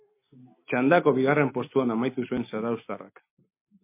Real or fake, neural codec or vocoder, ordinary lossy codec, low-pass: real; none; MP3, 24 kbps; 3.6 kHz